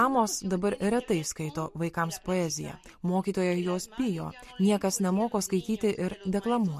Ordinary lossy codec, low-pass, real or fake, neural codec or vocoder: MP3, 64 kbps; 14.4 kHz; real; none